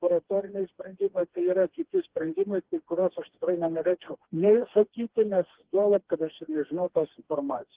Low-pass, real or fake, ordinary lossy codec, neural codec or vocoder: 3.6 kHz; fake; Opus, 16 kbps; codec, 16 kHz, 2 kbps, FreqCodec, smaller model